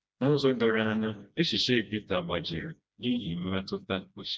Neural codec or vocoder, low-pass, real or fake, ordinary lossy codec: codec, 16 kHz, 1 kbps, FreqCodec, smaller model; none; fake; none